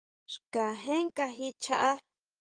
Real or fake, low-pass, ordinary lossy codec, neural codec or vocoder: fake; 9.9 kHz; Opus, 32 kbps; codec, 16 kHz in and 24 kHz out, 2.2 kbps, FireRedTTS-2 codec